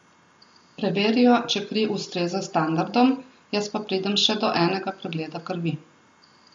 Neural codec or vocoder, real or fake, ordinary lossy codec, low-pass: none; real; MP3, 48 kbps; 19.8 kHz